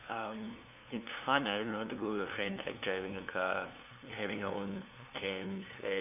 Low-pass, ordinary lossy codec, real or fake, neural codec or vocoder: 3.6 kHz; none; fake; codec, 16 kHz, 2 kbps, FunCodec, trained on LibriTTS, 25 frames a second